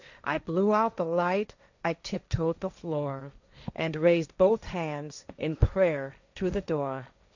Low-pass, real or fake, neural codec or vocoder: 7.2 kHz; fake; codec, 16 kHz, 1.1 kbps, Voila-Tokenizer